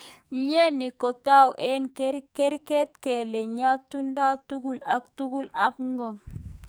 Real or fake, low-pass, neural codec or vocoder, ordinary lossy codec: fake; none; codec, 44.1 kHz, 2.6 kbps, SNAC; none